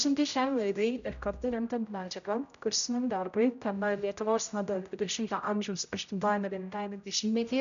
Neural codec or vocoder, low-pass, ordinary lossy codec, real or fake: codec, 16 kHz, 0.5 kbps, X-Codec, HuBERT features, trained on general audio; 7.2 kHz; MP3, 96 kbps; fake